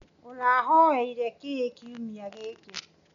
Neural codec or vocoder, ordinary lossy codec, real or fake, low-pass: none; none; real; 7.2 kHz